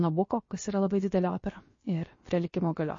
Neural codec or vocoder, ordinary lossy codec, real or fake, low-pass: codec, 16 kHz, about 1 kbps, DyCAST, with the encoder's durations; MP3, 32 kbps; fake; 7.2 kHz